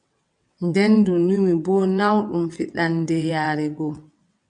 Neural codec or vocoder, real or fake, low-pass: vocoder, 22.05 kHz, 80 mel bands, WaveNeXt; fake; 9.9 kHz